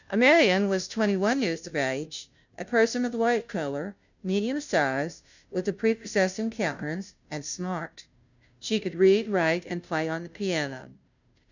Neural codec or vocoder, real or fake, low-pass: codec, 16 kHz, 0.5 kbps, FunCodec, trained on Chinese and English, 25 frames a second; fake; 7.2 kHz